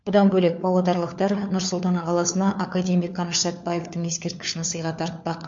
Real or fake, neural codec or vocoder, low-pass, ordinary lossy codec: fake; codec, 16 kHz, 4 kbps, FunCodec, trained on LibriTTS, 50 frames a second; 7.2 kHz; MP3, 48 kbps